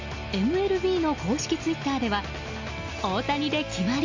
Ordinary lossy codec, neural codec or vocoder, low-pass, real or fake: AAC, 48 kbps; none; 7.2 kHz; real